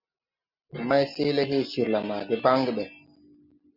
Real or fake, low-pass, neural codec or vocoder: real; 5.4 kHz; none